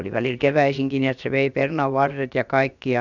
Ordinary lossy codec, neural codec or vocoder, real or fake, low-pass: none; codec, 16 kHz, about 1 kbps, DyCAST, with the encoder's durations; fake; 7.2 kHz